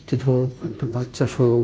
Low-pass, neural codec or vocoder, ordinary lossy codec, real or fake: none; codec, 16 kHz, 0.5 kbps, FunCodec, trained on Chinese and English, 25 frames a second; none; fake